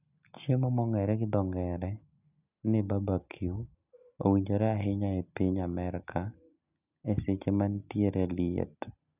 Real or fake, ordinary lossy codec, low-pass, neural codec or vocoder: real; none; 3.6 kHz; none